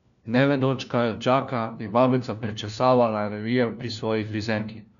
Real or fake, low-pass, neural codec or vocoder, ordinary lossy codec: fake; 7.2 kHz; codec, 16 kHz, 1 kbps, FunCodec, trained on LibriTTS, 50 frames a second; none